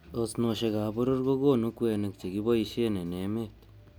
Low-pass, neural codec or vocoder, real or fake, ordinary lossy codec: none; none; real; none